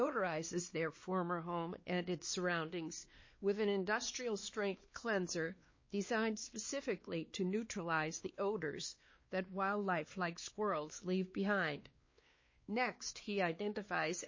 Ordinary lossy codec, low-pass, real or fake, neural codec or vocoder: MP3, 32 kbps; 7.2 kHz; fake; codec, 16 kHz, 2 kbps, X-Codec, WavLM features, trained on Multilingual LibriSpeech